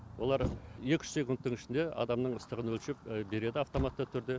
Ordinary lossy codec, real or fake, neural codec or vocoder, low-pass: none; real; none; none